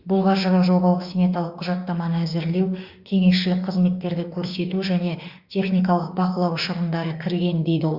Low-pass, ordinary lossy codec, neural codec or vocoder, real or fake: 5.4 kHz; Opus, 64 kbps; autoencoder, 48 kHz, 32 numbers a frame, DAC-VAE, trained on Japanese speech; fake